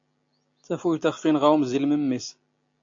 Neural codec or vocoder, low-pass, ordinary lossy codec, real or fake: none; 7.2 kHz; AAC, 48 kbps; real